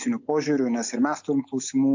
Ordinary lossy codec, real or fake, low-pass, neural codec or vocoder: AAC, 48 kbps; real; 7.2 kHz; none